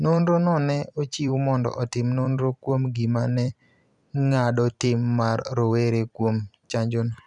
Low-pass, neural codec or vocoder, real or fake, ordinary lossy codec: 10.8 kHz; vocoder, 44.1 kHz, 128 mel bands every 256 samples, BigVGAN v2; fake; none